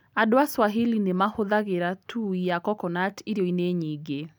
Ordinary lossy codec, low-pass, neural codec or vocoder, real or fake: none; 19.8 kHz; none; real